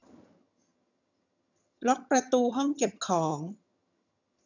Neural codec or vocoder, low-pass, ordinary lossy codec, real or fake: vocoder, 22.05 kHz, 80 mel bands, HiFi-GAN; 7.2 kHz; none; fake